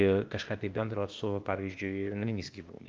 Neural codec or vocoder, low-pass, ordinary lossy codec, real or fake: codec, 16 kHz, 0.8 kbps, ZipCodec; 7.2 kHz; Opus, 24 kbps; fake